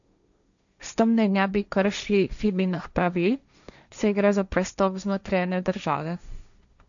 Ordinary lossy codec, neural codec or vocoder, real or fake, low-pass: none; codec, 16 kHz, 1.1 kbps, Voila-Tokenizer; fake; 7.2 kHz